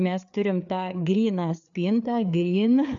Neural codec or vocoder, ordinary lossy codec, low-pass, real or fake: codec, 16 kHz, 4 kbps, FreqCodec, larger model; AAC, 64 kbps; 7.2 kHz; fake